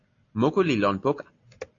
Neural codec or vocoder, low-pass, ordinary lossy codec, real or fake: none; 7.2 kHz; AAC, 32 kbps; real